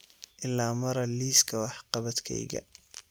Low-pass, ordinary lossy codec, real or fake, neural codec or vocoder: none; none; real; none